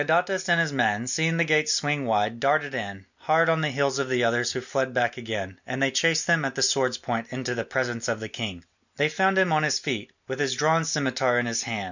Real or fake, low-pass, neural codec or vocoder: real; 7.2 kHz; none